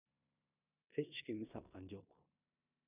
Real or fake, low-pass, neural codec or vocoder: fake; 3.6 kHz; codec, 16 kHz in and 24 kHz out, 0.9 kbps, LongCat-Audio-Codec, four codebook decoder